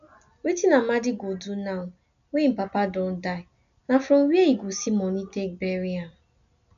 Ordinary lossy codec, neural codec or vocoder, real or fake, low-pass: none; none; real; 7.2 kHz